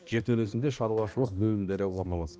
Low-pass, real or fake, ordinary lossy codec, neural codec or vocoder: none; fake; none; codec, 16 kHz, 1 kbps, X-Codec, HuBERT features, trained on balanced general audio